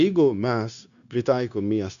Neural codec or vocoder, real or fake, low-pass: codec, 16 kHz, 0.9 kbps, LongCat-Audio-Codec; fake; 7.2 kHz